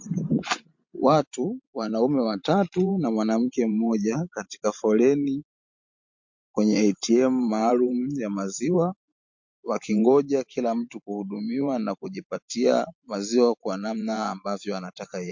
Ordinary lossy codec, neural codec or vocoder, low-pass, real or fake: MP3, 48 kbps; vocoder, 44.1 kHz, 128 mel bands every 512 samples, BigVGAN v2; 7.2 kHz; fake